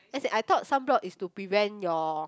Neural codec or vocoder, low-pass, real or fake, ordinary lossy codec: none; none; real; none